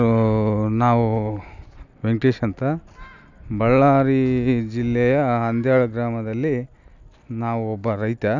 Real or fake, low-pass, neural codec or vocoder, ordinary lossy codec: real; 7.2 kHz; none; none